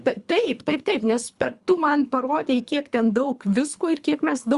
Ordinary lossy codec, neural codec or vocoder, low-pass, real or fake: Opus, 64 kbps; codec, 24 kHz, 3 kbps, HILCodec; 10.8 kHz; fake